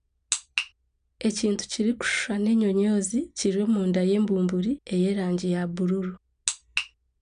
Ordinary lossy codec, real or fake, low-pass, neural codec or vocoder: none; real; 9.9 kHz; none